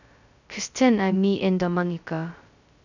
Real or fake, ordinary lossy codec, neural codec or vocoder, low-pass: fake; none; codec, 16 kHz, 0.2 kbps, FocalCodec; 7.2 kHz